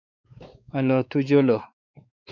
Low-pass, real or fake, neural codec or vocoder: 7.2 kHz; fake; codec, 16 kHz, 4 kbps, X-Codec, WavLM features, trained on Multilingual LibriSpeech